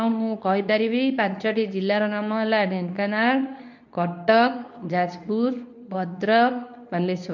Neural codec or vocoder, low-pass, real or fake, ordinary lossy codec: codec, 24 kHz, 0.9 kbps, WavTokenizer, medium speech release version 2; 7.2 kHz; fake; none